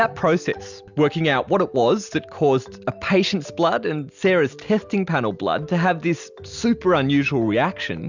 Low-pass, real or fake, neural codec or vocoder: 7.2 kHz; real; none